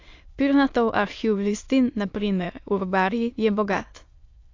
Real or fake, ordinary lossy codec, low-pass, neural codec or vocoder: fake; AAC, 48 kbps; 7.2 kHz; autoencoder, 22.05 kHz, a latent of 192 numbers a frame, VITS, trained on many speakers